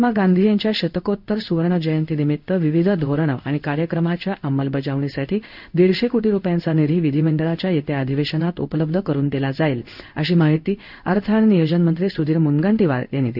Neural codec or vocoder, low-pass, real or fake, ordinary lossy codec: codec, 16 kHz in and 24 kHz out, 1 kbps, XY-Tokenizer; 5.4 kHz; fake; none